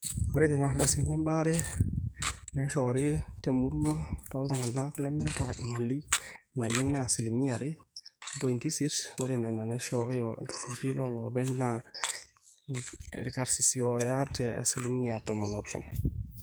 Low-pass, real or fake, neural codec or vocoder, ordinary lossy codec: none; fake; codec, 44.1 kHz, 2.6 kbps, SNAC; none